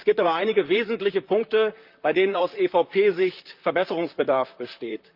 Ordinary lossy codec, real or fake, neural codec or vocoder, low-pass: Opus, 24 kbps; fake; vocoder, 44.1 kHz, 128 mel bands, Pupu-Vocoder; 5.4 kHz